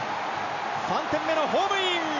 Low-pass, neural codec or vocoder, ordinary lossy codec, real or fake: 7.2 kHz; none; none; real